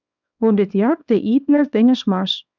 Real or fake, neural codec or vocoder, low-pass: fake; codec, 24 kHz, 0.9 kbps, WavTokenizer, small release; 7.2 kHz